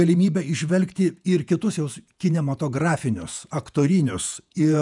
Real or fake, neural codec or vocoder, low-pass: fake; vocoder, 48 kHz, 128 mel bands, Vocos; 10.8 kHz